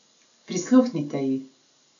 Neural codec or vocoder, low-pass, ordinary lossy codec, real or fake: none; 7.2 kHz; none; real